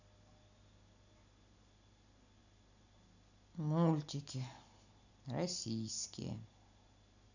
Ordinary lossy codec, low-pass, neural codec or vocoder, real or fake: none; 7.2 kHz; none; real